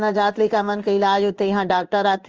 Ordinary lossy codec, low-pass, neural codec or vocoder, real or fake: Opus, 24 kbps; 7.2 kHz; codec, 16 kHz in and 24 kHz out, 1 kbps, XY-Tokenizer; fake